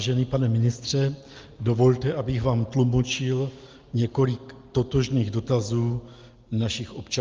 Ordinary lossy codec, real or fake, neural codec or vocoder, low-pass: Opus, 24 kbps; real; none; 7.2 kHz